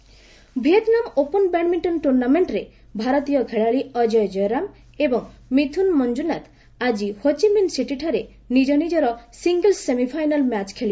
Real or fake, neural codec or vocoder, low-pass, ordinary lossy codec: real; none; none; none